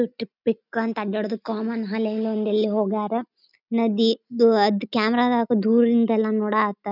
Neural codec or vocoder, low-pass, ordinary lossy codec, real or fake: none; 5.4 kHz; none; real